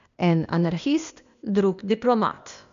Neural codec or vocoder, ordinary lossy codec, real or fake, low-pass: codec, 16 kHz, 0.8 kbps, ZipCodec; none; fake; 7.2 kHz